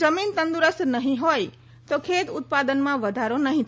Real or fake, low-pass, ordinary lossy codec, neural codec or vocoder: real; none; none; none